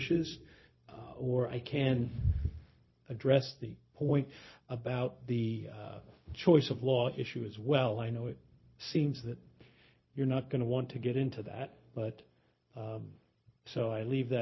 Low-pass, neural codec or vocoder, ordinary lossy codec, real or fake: 7.2 kHz; codec, 16 kHz, 0.4 kbps, LongCat-Audio-Codec; MP3, 24 kbps; fake